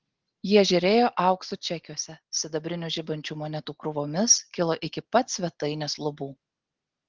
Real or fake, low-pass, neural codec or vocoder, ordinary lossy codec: real; 7.2 kHz; none; Opus, 16 kbps